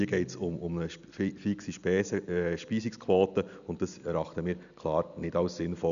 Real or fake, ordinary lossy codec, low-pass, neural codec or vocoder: real; none; 7.2 kHz; none